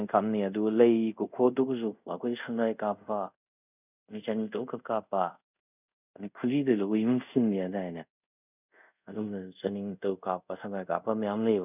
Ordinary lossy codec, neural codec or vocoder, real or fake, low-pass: none; codec, 24 kHz, 0.5 kbps, DualCodec; fake; 3.6 kHz